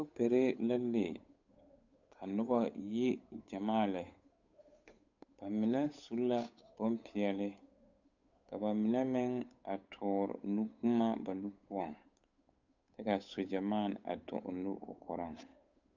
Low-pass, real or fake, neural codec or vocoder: 7.2 kHz; fake; codec, 16 kHz, 8 kbps, FunCodec, trained on Chinese and English, 25 frames a second